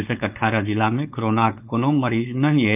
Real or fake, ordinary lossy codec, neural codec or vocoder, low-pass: fake; none; codec, 16 kHz, 4.8 kbps, FACodec; 3.6 kHz